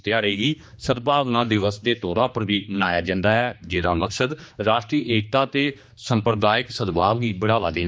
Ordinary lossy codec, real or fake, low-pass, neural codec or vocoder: none; fake; none; codec, 16 kHz, 2 kbps, X-Codec, HuBERT features, trained on general audio